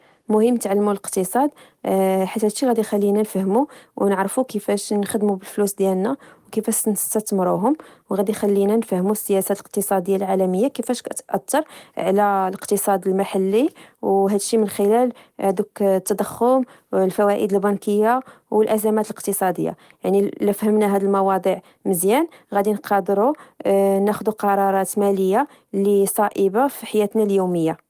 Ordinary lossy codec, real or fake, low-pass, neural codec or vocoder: Opus, 24 kbps; real; 14.4 kHz; none